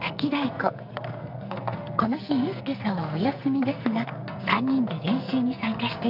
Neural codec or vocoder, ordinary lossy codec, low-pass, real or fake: codec, 44.1 kHz, 2.6 kbps, SNAC; none; 5.4 kHz; fake